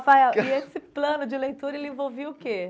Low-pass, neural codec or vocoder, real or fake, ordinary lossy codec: none; none; real; none